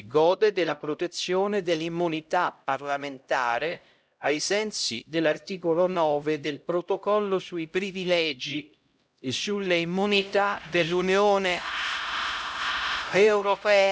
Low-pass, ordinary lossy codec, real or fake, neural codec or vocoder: none; none; fake; codec, 16 kHz, 0.5 kbps, X-Codec, HuBERT features, trained on LibriSpeech